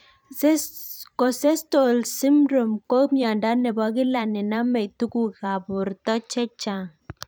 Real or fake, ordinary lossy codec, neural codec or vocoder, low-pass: fake; none; vocoder, 44.1 kHz, 128 mel bands every 512 samples, BigVGAN v2; none